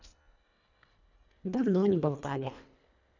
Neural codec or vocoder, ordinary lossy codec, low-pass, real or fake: codec, 24 kHz, 1.5 kbps, HILCodec; none; 7.2 kHz; fake